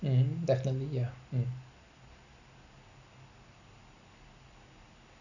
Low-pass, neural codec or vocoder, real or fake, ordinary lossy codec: 7.2 kHz; none; real; none